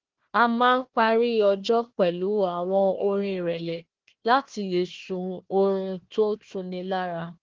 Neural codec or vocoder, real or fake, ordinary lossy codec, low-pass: codec, 16 kHz, 1 kbps, FunCodec, trained on Chinese and English, 50 frames a second; fake; Opus, 16 kbps; 7.2 kHz